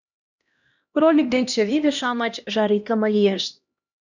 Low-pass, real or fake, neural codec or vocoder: 7.2 kHz; fake; codec, 16 kHz, 1 kbps, X-Codec, HuBERT features, trained on LibriSpeech